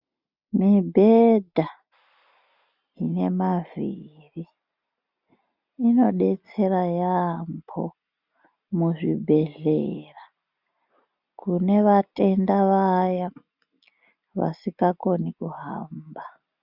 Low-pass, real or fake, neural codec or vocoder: 5.4 kHz; real; none